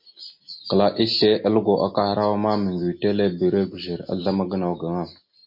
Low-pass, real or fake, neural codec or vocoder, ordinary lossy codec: 5.4 kHz; real; none; MP3, 32 kbps